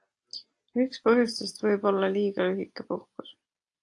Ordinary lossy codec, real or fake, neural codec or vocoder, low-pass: AAC, 48 kbps; real; none; 10.8 kHz